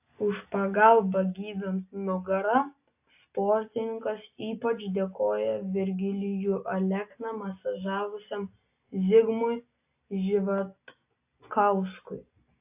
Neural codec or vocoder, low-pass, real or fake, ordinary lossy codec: none; 3.6 kHz; real; AAC, 32 kbps